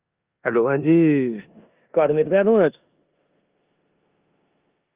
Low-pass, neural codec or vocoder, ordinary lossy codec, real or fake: 3.6 kHz; codec, 16 kHz in and 24 kHz out, 0.9 kbps, LongCat-Audio-Codec, four codebook decoder; Opus, 24 kbps; fake